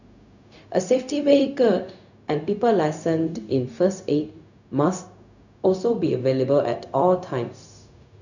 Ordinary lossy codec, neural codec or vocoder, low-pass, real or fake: none; codec, 16 kHz, 0.4 kbps, LongCat-Audio-Codec; 7.2 kHz; fake